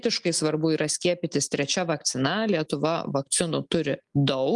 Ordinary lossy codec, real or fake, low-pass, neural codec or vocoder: Opus, 32 kbps; real; 10.8 kHz; none